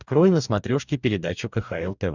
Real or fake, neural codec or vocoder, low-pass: fake; codec, 44.1 kHz, 2.6 kbps, DAC; 7.2 kHz